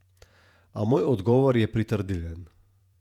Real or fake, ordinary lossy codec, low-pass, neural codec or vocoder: fake; none; 19.8 kHz; vocoder, 48 kHz, 128 mel bands, Vocos